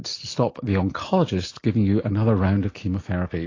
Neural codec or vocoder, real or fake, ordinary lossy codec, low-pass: none; real; AAC, 32 kbps; 7.2 kHz